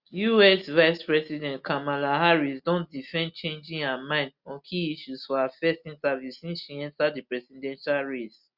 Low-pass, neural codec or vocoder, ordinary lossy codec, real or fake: 5.4 kHz; none; Opus, 64 kbps; real